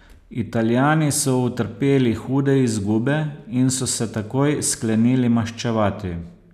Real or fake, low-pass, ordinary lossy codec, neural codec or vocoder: real; 14.4 kHz; none; none